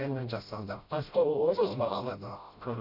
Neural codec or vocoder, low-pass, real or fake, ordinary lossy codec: codec, 16 kHz, 0.5 kbps, FreqCodec, smaller model; 5.4 kHz; fake; Opus, 64 kbps